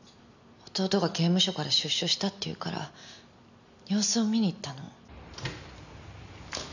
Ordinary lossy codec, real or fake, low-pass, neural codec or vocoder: none; real; 7.2 kHz; none